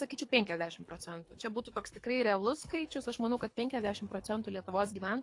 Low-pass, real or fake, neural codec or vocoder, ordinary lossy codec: 10.8 kHz; fake; codec, 24 kHz, 3 kbps, HILCodec; AAC, 48 kbps